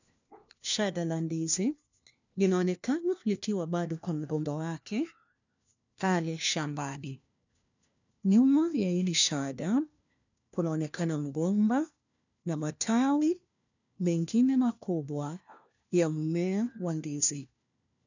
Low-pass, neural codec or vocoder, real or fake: 7.2 kHz; codec, 16 kHz, 1 kbps, FunCodec, trained on LibriTTS, 50 frames a second; fake